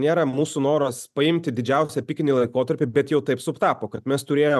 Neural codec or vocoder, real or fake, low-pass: none; real; 14.4 kHz